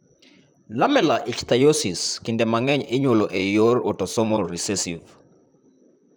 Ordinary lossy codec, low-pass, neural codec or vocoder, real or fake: none; none; vocoder, 44.1 kHz, 128 mel bands, Pupu-Vocoder; fake